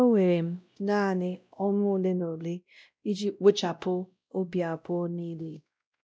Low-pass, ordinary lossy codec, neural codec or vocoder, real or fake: none; none; codec, 16 kHz, 0.5 kbps, X-Codec, WavLM features, trained on Multilingual LibriSpeech; fake